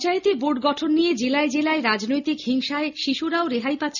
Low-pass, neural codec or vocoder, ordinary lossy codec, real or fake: 7.2 kHz; none; none; real